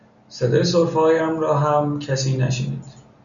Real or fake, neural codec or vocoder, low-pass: real; none; 7.2 kHz